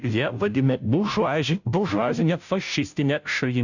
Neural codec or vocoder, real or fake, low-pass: codec, 16 kHz, 0.5 kbps, FunCodec, trained on Chinese and English, 25 frames a second; fake; 7.2 kHz